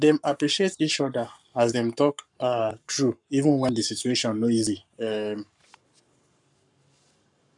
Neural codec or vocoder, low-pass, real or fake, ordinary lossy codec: codec, 44.1 kHz, 7.8 kbps, Pupu-Codec; 10.8 kHz; fake; none